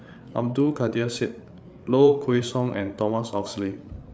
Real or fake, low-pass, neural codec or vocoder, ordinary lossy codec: fake; none; codec, 16 kHz, 8 kbps, FreqCodec, larger model; none